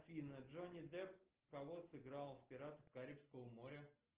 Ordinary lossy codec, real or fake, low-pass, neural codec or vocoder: Opus, 16 kbps; real; 3.6 kHz; none